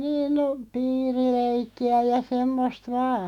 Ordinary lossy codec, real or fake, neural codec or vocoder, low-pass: none; fake; autoencoder, 48 kHz, 128 numbers a frame, DAC-VAE, trained on Japanese speech; 19.8 kHz